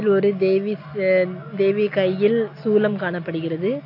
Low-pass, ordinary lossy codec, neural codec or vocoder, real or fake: 5.4 kHz; AAC, 32 kbps; none; real